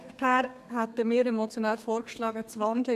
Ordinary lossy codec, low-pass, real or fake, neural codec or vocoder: none; 14.4 kHz; fake; codec, 32 kHz, 1.9 kbps, SNAC